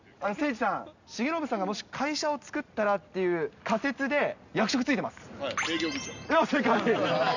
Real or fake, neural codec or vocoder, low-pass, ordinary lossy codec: real; none; 7.2 kHz; none